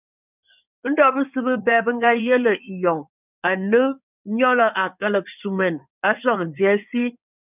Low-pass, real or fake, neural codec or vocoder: 3.6 kHz; fake; codec, 16 kHz in and 24 kHz out, 2.2 kbps, FireRedTTS-2 codec